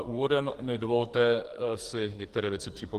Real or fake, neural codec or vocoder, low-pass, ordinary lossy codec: fake; codec, 44.1 kHz, 2.6 kbps, SNAC; 14.4 kHz; Opus, 24 kbps